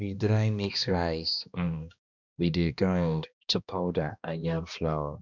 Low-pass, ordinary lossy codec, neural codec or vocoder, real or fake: 7.2 kHz; none; codec, 16 kHz, 1 kbps, X-Codec, HuBERT features, trained on balanced general audio; fake